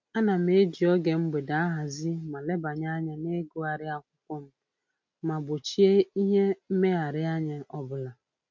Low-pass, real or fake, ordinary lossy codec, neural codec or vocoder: none; real; none; none